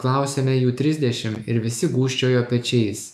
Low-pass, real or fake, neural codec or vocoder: 14.4 kHz; fake; autoencoder, 48 kHz, 128 numbers a frame, DAC-VAE, trained on Japanese speech